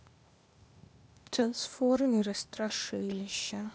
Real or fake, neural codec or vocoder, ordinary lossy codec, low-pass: fake; codec, 16 kHz, 0.8 kbps, ZipCodec; none; none